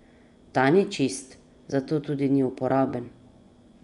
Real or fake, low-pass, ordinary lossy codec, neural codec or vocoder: fake; 10.8 kHz; none; vocoder, 24 kHz, 100 mel bands, Vocos